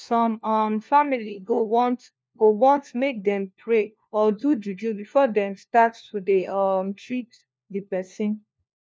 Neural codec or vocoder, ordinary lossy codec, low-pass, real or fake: codec, 16 kHz, 1 kbps, FunCodec, trained on LibriTTS, 50 frames a second; none; none; fake